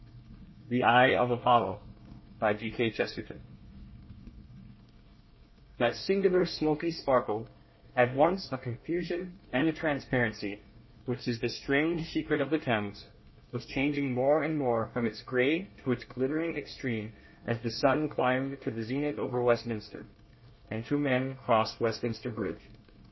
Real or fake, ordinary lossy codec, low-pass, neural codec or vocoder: fake; MP3, 24 kbps; 7.2 kHz; codec, 24 kHz, 1 kbps, SNAC